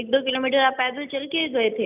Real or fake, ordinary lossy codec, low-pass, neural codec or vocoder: real; none; 3.6 kHz; none